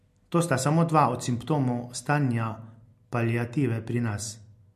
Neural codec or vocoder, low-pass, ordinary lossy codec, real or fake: none; 14.4 kHz; MP3, 64 kbps; real